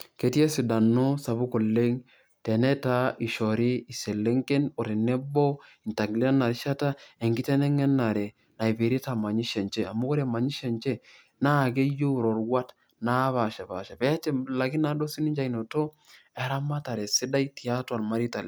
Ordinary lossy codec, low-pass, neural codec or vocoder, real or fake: none; none; none; real